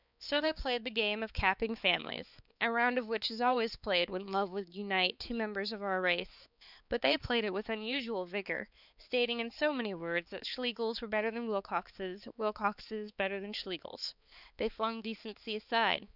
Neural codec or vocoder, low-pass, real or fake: codec, 16 kHz, 4 kbps, X-Codec, HuBERT features, trained on balanced general audio; 5.4 kHz; fake